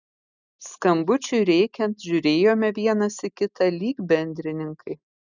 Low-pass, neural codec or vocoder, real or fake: 7.2 kHz; none; real